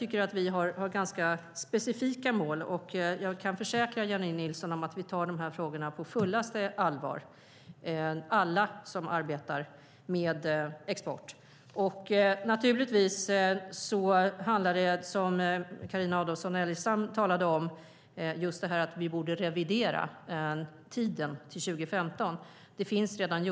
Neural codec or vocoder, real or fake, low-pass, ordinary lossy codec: none; real; none; none